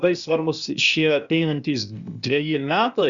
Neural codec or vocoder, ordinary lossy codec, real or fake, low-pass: codec, 16 kHz, 0.8 kbps, ZipCodec; Opus, 64 kbps; fake; 7.2 kHz